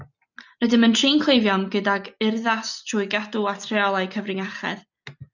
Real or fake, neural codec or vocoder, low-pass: real; none; 7.2 kHz